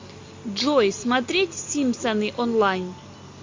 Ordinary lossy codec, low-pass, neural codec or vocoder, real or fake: MP3, 48 kbps; 7.2 kHz; none; real